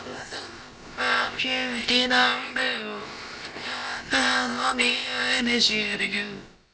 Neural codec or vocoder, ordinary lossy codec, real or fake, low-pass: codec, 16 kHz, about 1 kbps, DyCAST, with the encoder's durations; none; fake; none